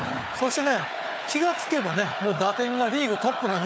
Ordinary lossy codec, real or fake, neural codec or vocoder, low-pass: none; fake; codec, 16 kHz, 4 kbps, FunCodec, trained on Chinese and English, 50 frames a second; none